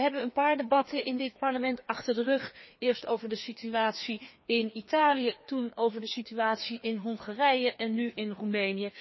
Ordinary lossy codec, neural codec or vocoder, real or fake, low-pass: MP3, 24 kbps; codec, 16 kHz, 2 kbps, FreqCodec, larger model; fake; 7.2 kHz